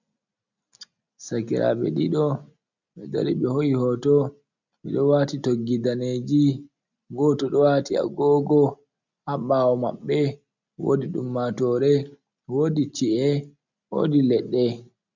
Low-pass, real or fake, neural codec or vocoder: 7.2 kHz; real; none